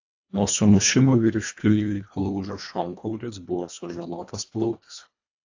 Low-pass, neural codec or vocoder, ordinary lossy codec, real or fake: 7.2 kHz; codec, 24 kHz, 1.5 kbps, HILCodec; AAC, 48 kbps; fake